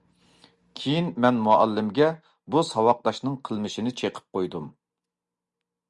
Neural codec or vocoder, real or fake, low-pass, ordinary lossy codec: none; real; 10.8 kHz; Opus, 64 kbps